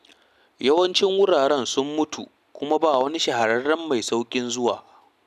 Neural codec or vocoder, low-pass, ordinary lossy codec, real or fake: none; 14.4 kHz; none; real